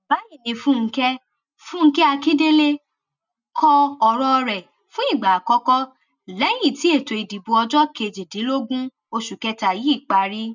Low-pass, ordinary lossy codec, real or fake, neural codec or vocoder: 7.2 kHz; none; real; none